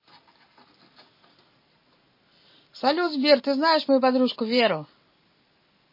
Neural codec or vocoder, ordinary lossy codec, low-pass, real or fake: none; MP3, 24 kbps; 5.4 kHz; real